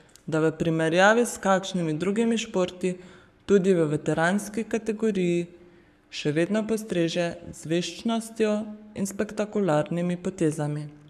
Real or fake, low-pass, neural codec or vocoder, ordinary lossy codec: fake; 14.4 kHz; codec, 44.1 kHz, 7.8 kbps, Pupu-Codec; none